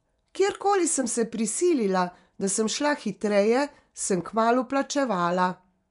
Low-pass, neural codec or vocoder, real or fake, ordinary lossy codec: 10.8 kHz; vocoder, 24 kHz, 100 mel bands, Vocos; fake; none